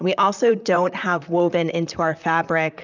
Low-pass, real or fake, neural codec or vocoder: 7.2 kHz; fake; vocoder, 44.1 kHz, 128 mel bands, Pupu-Vocoder